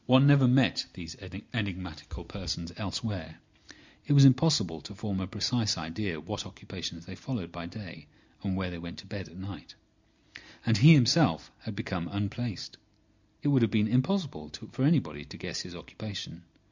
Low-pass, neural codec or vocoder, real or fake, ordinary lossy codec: 7.2 kHz; none; real; MP3, 64 kbps